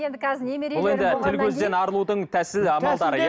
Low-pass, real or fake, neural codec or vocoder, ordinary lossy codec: none; real; none; none